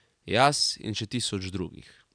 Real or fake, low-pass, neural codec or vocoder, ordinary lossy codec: real; 9.9 kHz; none; none